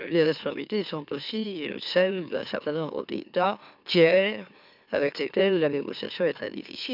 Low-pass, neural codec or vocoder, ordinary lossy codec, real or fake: 5.4 kHz; autoencoder, 44.1 kHz, a latent of 192 numbers a frame, MeloTTS; none; fake